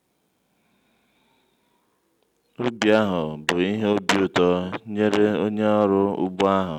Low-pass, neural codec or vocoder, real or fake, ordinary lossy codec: 19.8 kHz; none; real; none